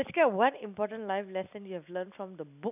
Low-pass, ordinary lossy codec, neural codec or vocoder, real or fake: 3.6 kHz; none; none; real